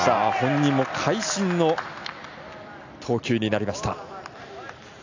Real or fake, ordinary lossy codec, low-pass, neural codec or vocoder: real; AAC, 48 kbps; 7.2 kHz; none